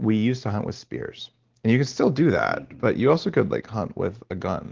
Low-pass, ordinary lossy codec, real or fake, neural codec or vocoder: 7.2 kHz; Opus, 16 kbps; real; none